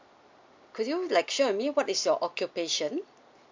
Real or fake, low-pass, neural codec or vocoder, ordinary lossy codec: real; 7.2 kHz; none; MP3, 64 kbps